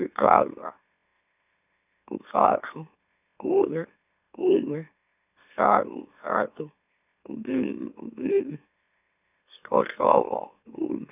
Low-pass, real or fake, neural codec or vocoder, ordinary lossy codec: 3.6 kHz; fake; autoencoder, 44.1 kHz, a latent of 192 numbers a frame, MeloTTS; none